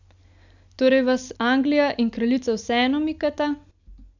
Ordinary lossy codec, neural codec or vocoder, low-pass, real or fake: none; none; 7.2 kHz; real